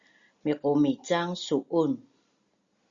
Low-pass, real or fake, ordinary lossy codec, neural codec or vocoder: 7.2 kHz; real; Opus, 64 kbps; none